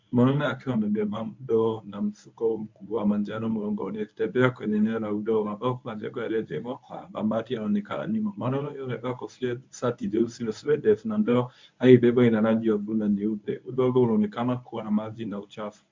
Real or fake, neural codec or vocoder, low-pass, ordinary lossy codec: fake; codec, 24 kHz, 0.9 kbps, WavTokenizer, medium speech release version 1; 7.2 kHz; MP3, 64 kbps